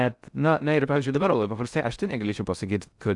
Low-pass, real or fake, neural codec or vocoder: 10.8 kHz; fake; codec, 16 kHz in and 24 kHz out, 0.6 kbps, FocalCodec, streaming, 4096 codes